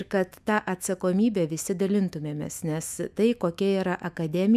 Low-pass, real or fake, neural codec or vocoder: 14.4 kHz; fake; autoencoder, 48 kHz, 128 numbers a frame, DAC-VAE, trained on Japanese speech